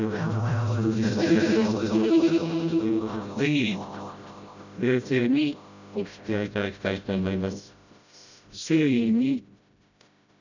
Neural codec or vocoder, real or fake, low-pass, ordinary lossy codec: codec, 16 kHz, 0.5 kbps, FreqCodec, smaller model; fake; 7.2 kHz; none